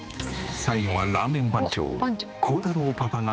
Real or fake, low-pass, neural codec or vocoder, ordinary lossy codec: fake; none; codec, 16 kHz, 2 kbps, X-Codec, HuBERT features, trained on general audio; none